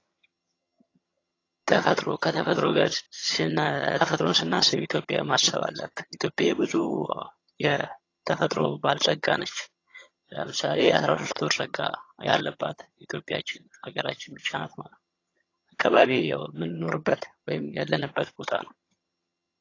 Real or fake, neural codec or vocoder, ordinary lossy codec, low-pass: fake; vocoder, 22.05 kHz, 80 mel bands, HiFi-GAN; AAC, 32 kbps; 7.2 kHz